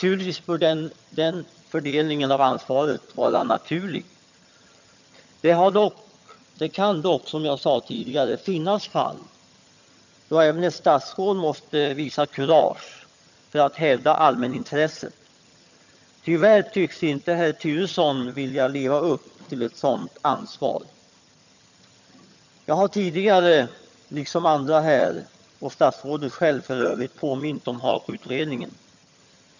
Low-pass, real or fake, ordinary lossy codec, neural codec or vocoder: 7.2 kHz; fake; none; vocoder, 22.05 kHz, 80 mel bands, HiFi-GAN